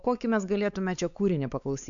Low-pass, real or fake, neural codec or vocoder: 7.2 kHz; fake; codec, 16 kHz, 4 kbps, X-Codec, WavLM features, trained on Multilingual LibriSpeech